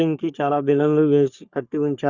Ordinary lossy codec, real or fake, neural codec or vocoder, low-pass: Opus, 64 kbps; fake; codec, 44.1 kHz, 3.4 kbps, Pupu-Codec; 7.2 kHz